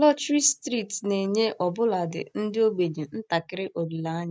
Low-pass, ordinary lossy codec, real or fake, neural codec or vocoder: none; none; real; none